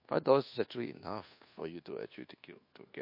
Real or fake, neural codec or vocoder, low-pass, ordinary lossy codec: fake; codec, 24 kHz, 1.2 kbps, DualCodec; 5.4 kHz; MP3, 32 kbps